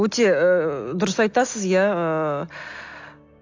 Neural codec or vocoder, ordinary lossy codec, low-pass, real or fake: none; AAC, 48 kbps; 7.2 kHz; real